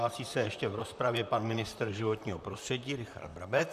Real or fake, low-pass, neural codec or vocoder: fake; 14.4 kHz; vocoder, 44.1 kHz, 128 mel bands, Pupu-Vocoder